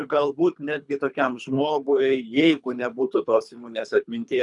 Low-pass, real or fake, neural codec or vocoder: 10.8 kHz; fake; codec, 24 kHz, 3 kbps, HILCodec